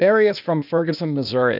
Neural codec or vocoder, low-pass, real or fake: codec, 16 kHz, 0.8 kbps, ZipCodec; 5.4 kHz; fake